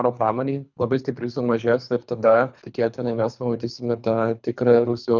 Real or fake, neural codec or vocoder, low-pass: fake; codec, 24 kHz, 3 kbps, HILCodec; 7.2 kHz